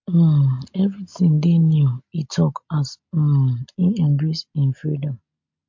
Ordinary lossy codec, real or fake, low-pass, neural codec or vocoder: MP3, 48 kbps; real; 7.2 kHz; none